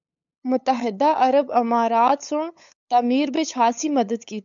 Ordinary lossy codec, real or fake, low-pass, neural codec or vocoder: MP3, 96 kbps; fake; 7.2 kHz; codec, 16 kHz, 8 kbps, FunCodec, trained on LibriTTS, 25 frames a second